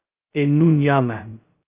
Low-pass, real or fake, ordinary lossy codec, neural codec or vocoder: 3.6 kHz; fake; Opus, 24 kbps; codec, 16 kHz, 0.2 kbps, FocalCodec